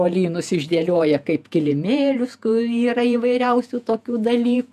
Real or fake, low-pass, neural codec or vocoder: fake; 14.4 kHz; vocoder, 48 kHz, 128 mel bands, Vocos